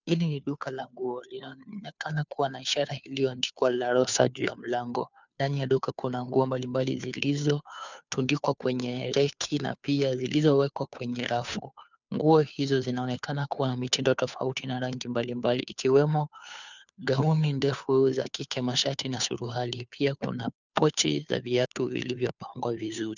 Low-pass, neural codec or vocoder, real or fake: 7.2 kHz; codec, 16 kHz, 2 kbps, FunCodec, trained on Chinese and English, 25 frames a second; fake